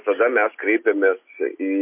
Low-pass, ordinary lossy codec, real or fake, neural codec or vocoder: 3.6 kHz; MP3, 24 kbps; real; none